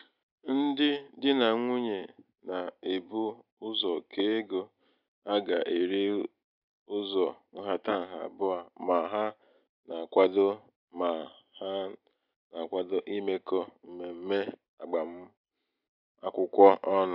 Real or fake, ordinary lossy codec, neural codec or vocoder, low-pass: real; none; none; 5.4 kHz